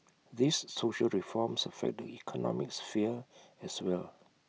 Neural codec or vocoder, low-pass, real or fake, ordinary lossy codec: none; none; real; none